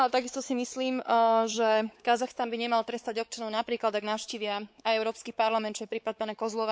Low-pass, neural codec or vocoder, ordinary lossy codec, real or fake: none; codec, 16 kHz, 4 kbps, X-Codec, WavLM features, trained on Multilingual LibriSpeech; none; fake